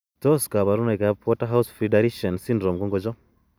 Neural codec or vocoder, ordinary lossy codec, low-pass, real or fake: none; none; none; real